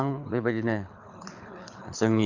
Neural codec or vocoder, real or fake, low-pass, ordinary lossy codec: codec, 24 kHz, 6 kbps, HILCodec; fake; 7.2 kHz; none